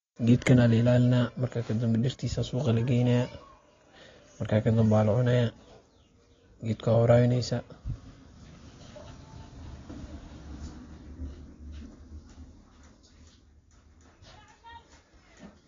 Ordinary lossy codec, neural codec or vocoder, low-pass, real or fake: AAC, 32 kbps; none; 7.2 kHz; real